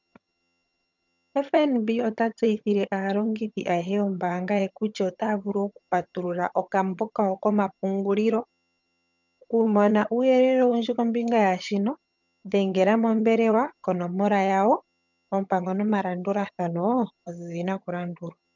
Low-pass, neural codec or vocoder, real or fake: 7.2 kHz; vocoder, 22.05 kHz, 80 mel bands, HiFi-GAN; fake